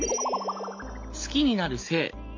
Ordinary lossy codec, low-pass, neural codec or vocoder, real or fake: MP3, 48 kbps; 7.2 kHz; none; real